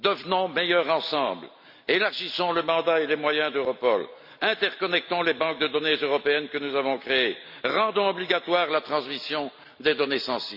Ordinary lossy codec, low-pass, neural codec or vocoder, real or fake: AAC, 48 kbps; 5.4 kHz; none; real